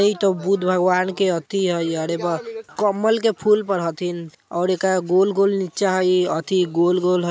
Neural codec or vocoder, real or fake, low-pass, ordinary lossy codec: none; real; none; none